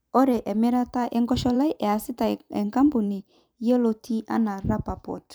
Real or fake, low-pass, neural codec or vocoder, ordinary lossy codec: fake; none; vocoder, 44.1 kHz, 128 mel bands every 512 samples, BigVGAN v2; none